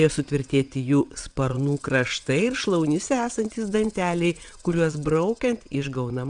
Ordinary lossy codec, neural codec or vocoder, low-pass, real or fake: Opus, 64 kbps; vocoder, 22.05 kHz, 80 mel bands, WaveNeXt; 9.9 kHz; fake